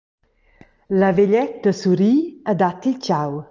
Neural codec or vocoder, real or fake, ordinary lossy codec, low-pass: none; real; Opus, 24 kbps; 7.2 kHz